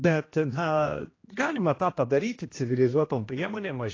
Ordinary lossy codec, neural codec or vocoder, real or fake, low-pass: AAC, 32 kbps; codec, 16 kHz, 1 kbps, X-Codec, HuBERT features, trained on general audio; fake; 7.2 kHz